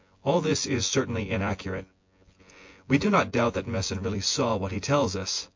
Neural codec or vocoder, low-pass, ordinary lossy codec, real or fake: vocoder, 24 kHz, 100 mel bands, Vocos; 7.2 kHz; MP3, 48 kbps; fake